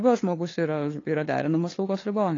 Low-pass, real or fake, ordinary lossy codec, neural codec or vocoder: 7.2 kHz; fake; AAC, 32 kbps; codec, 16 kHz, 2 kbps, FunCodec, trained on LibriTTS, 25 frames a second